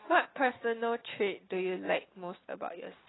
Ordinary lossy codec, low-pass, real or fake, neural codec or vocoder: AAC, 16 kbps; 7.2 kHz; real; none